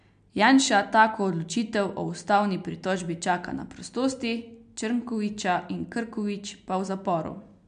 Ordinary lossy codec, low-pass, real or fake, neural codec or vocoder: MP3, 64 kbps; 9.9 kHz; real; none